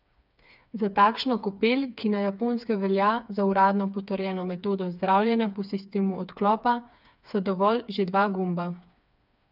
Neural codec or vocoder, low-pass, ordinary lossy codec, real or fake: codec, 16 kHz, 4 kbps, FreqCodec, smaller model; 5.4 kHz; none; fake